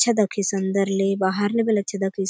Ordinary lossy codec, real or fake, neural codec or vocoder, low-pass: none; real; none; none